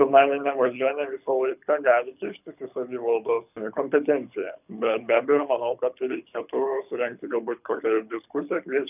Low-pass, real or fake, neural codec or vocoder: 3.6 kHz; fake; codec, 24 kHz, 6 kbps, HILCodec